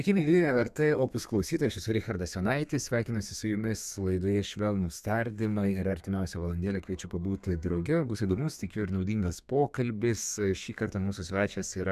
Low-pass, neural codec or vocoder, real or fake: 14.4 kHz; codec, 32 kHz, 1.9 kbps, SNAC; fake